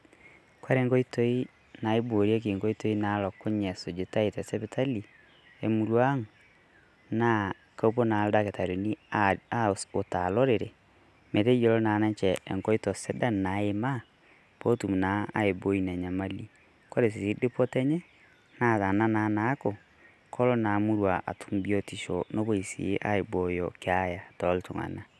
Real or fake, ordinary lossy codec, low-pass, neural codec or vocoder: real; none; none; none